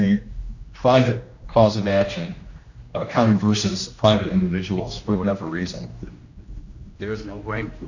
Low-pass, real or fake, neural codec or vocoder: 7.2 kHz; fake; codec, 16 kHz, 1 kbps, X-Codec, HuBERT features, trained on general audio